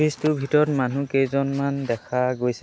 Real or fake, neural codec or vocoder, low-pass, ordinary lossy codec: real; none; none; none